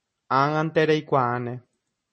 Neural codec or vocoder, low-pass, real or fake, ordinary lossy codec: none; 10.8 kHz; real; MP3, 32 kbps